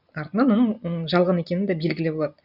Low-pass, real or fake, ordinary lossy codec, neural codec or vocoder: 5.4 kHz; real; none; none